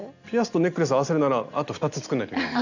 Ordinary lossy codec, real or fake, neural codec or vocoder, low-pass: none; real; none; 7.2 kHz